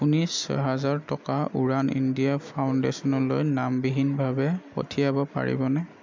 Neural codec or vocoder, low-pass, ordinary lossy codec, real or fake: none; 7.2 kHz; MP3, 64 kbps; real